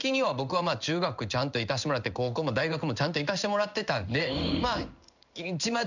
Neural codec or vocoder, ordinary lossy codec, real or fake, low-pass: codec, 16 kHz in and 24 kHz out, 1 kbps, XY-Tokenizer; none; fake; 7.2 kHz